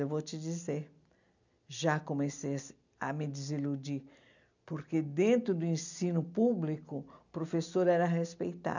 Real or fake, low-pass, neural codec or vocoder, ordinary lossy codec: real; 7.2 kHz; none; none